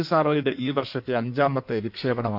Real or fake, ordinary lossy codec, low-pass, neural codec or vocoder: fake; none; 5.4 kHz; codec, 16 kHz in and 24 kHz out, 1.1 kbps, FireRedTTS-2 codec